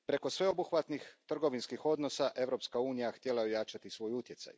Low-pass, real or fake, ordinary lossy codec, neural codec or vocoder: none; real; none; none